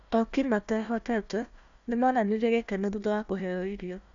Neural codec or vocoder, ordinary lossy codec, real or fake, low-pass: codec, 16 kHz, 1 kbps, FunCodec, trained on Chinese and English, 50 frames a second; none; fake; 7.2 kHz